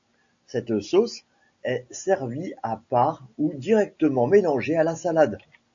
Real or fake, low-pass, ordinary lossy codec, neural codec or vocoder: real; 7.2 kHz; AAC, 64 kbps; none